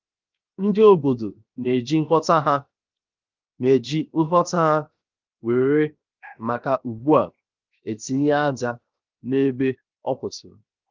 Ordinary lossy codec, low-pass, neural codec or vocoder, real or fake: Opus, 24 kbps; 7.2 kHz; codec, 16 kHz, 0.7 kbps, FocalCodec; fake